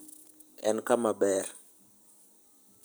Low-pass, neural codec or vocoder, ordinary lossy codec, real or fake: none; none; none; real